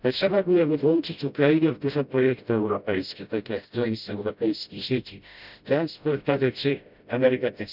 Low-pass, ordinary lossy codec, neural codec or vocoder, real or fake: 5.4 kHz; none; codec, 16 kHz, 0.5 kbps, FreqCodec, smaller model; fake